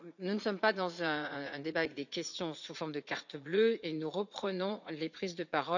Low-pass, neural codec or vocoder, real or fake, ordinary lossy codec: 7.2 kHz; vocoder, 44.1 kHz, 80 mel bands, Vocos; fake; none